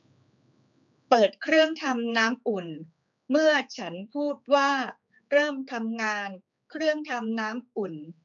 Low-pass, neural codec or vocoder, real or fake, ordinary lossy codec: 7.2 kHz; codec, 16 kHz, 4 kbps, X-Codec, HuBERT features, trained on general audio; fake; MP3, 64 kbps